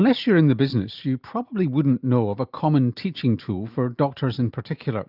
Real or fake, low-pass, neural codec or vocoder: real; 5.4 kHz; none